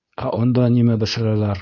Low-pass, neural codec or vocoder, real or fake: 7.2 kHz; codec, 24 kHz, 0.9 kbps, WavTokenizer, medium speech release version 2; fake